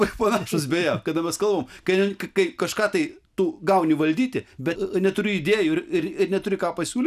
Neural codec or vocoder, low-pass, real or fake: none; 14.4 kHz; real